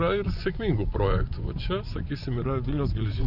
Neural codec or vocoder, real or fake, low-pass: vocoder, 24 kHz, 100 mel bands, Vocos; fake; 5.4 kHz